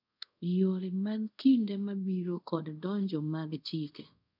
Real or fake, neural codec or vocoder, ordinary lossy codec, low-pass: fake; codec, 24 kHz, 0.5 kbps, DualCodec; none; 5.4 kHz